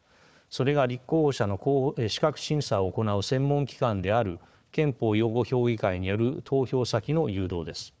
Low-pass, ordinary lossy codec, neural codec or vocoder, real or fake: none; none; codec, 16 kHz, 4 kbps, FunCodec, trained on Chinese and English, 50 frames a second; fake